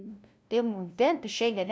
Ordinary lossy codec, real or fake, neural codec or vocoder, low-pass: none; fake; codec, 16 kHz, 0.5 kbps, FunCodec, trained on LibriTTS, 25 frames a second; none